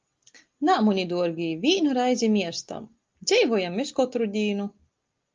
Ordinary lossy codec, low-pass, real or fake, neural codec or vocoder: Opus, 24 kbps; 7.2 kHz; real; none